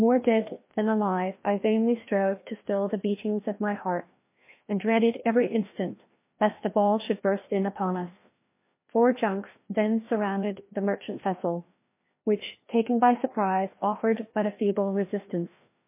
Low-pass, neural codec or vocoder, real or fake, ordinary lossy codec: 3.6 kHz; codec, 16 kHz, 1 kbps, FreqCodec, larger model; fake; MP3, 24 kbps